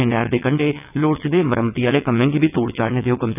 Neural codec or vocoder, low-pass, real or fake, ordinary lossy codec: vocoder, 22.05 kHz, 80 mel bands, WaveNeXt; 3.6 kHz; fake; none